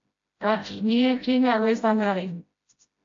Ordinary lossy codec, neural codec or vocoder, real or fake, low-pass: AAC, 48 kbps; codec, 16 kHz, 0.5 kbps, FreqCodec, smaller model; fake; 7.2 kHz